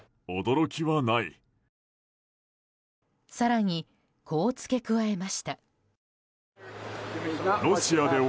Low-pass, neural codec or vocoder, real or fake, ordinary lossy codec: none; none; real; none